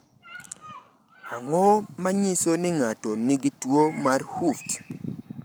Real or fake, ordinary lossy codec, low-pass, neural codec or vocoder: fake; none; none; codec, 44.1 kHz, 7.8 kbps, Pupu-Codec